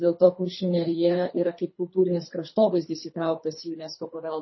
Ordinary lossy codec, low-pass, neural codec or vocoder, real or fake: MP3, 24 kbps; 7.2 kHz; codec, 24 kHz, 3 kbps, HILCodec; fake